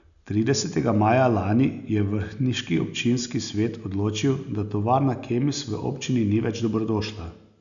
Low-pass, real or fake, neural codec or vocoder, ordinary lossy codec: 7.2 kHz; real; none; none